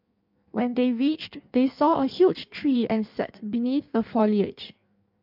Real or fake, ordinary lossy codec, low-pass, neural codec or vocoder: fake; MP3, 48 kbps; 5.4 kHz; codec, 16 kHz in and 24 kHz out, 1.1 kbps, FireRedTTS-2 codec